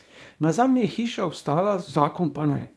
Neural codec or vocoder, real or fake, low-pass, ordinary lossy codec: codec, 24 kHz, 0.9 kbps, WavTokenizer, small release; fake; none; none